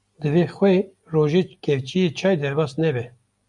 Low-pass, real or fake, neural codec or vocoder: 10.8 kHz; real; none